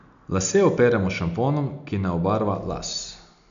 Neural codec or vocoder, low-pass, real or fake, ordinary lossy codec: none; 7.2 kHz; real; none